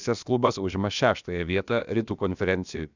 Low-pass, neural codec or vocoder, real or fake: 7.2 kHz; codec, 16 kHz, about 1 kbps, DyCAST, with the encoder's durations; fake